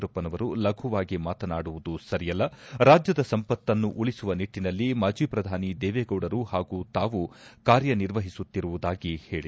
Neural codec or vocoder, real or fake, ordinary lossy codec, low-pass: none; real; none; none